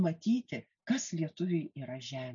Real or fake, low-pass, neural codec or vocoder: real; 7.2 kHz; none